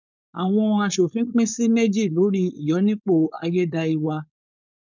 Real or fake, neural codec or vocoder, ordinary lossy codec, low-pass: fake; codec, 16 kHz, 4.8 kbps, FACodec; none; 7.2 kHz